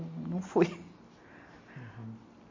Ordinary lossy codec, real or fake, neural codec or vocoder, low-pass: MP3, 32 kbps; real; none; 7.2 kHz